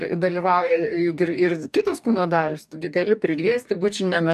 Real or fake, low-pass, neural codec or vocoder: fake; 14.4 kHz; codec, 44.1 kHz, 2.6 kbps, DAC